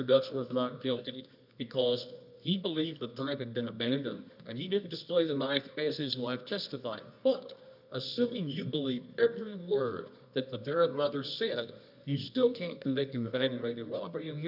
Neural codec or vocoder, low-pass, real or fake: codec, 24 kHz, 0.9 kbps, WavTokenizer, medium music audio release; 5.4 kHz; fake